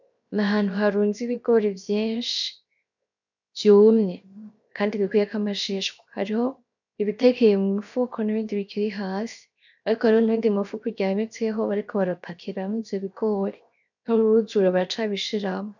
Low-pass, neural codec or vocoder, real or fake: 7.2 kHz; codec, 16 kHz, 0.7 kbps, FocalCodec; fake